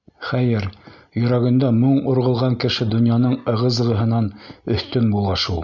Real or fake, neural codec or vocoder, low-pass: real; none; 7.2 kHz